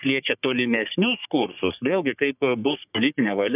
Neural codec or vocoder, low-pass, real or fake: codec, 44.1 kHz, 3.4 kbps, Pupu-Codec; 3.6 kHz; fake